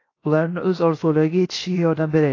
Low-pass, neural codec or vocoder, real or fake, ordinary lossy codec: 7.2 kHz; codec, 16 kHz, 0.7 kbps, FocalCodec; fake; AAC, 32 kbps